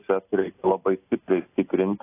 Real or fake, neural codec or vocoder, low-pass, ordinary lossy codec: real; none; 3.6 kHz; AAC, 24 kbps